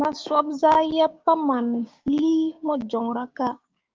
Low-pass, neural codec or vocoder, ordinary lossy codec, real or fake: 7.2 kHz; codec, 16 kHz, 6 kbps, DAC; Opus, 24 kbps; fake